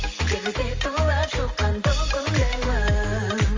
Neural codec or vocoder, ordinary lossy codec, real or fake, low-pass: none; Opus, 32 kbps; real; 7.2 kHz